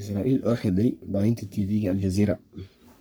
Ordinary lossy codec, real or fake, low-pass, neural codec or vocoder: none; fake; none; codec, 44.1 kHz, 3.4 kbps, Pupu-Codec